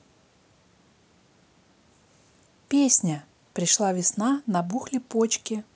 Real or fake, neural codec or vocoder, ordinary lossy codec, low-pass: real; none; none; none